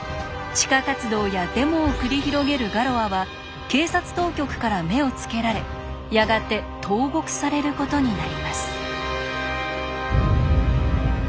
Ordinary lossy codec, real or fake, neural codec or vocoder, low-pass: none; real; none; none